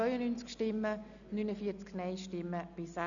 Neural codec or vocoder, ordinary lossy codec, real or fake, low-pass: none; none; real; 7.2 kHz